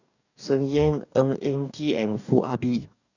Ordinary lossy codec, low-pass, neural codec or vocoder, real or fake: none; 7.2 kHz; codec, 44.1 kHz, 2.6 kbps, DAC; fake